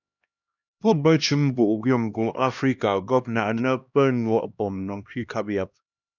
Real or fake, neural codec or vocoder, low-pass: fake; codec, 16 kHz, 1 kbps, X-Codec, HuBERT features, trained on LibriSpeech; 7.2 kHz